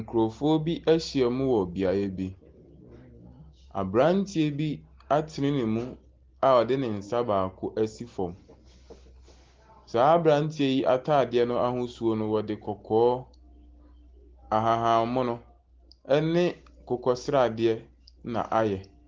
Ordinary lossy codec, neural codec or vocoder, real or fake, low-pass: Opus, 16 kbps; none; real; 7.2 kHz